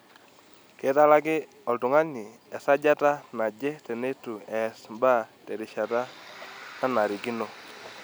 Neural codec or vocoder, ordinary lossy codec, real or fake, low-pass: none; none; real; none